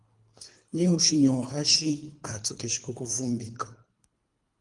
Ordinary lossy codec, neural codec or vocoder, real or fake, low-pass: Opus, 32 kbps; codec, 24 kHz, 3 kbps, HILCodec; fake; 10.8 kHz